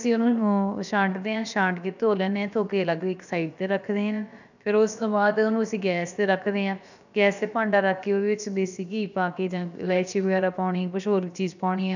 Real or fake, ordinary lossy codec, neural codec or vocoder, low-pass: fake; none; codec, 16 kHz, about 1 kbps, DyCAST, with the encoder's durations; 7.2 kHz